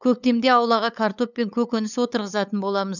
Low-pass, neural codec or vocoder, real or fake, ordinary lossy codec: 7.2 kHz; codec, 16 kHz, 8 kbps, FunCodec, trained on LibriTTS, 25 frames a second; fake; none